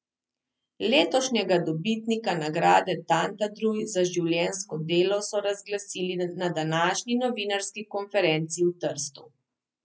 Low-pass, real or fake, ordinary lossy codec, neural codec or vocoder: none; real; none; none